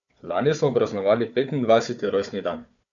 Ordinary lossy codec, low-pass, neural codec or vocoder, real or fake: none; 7.2 kHz; codec, 16 kHz, 4 kbps, FunCodec, trained on Chinese and English, 50 frames a second; fake